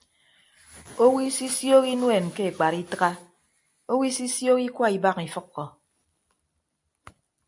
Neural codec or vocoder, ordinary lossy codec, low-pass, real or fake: none; AAC, 64 kbps; 10.8 kHz; real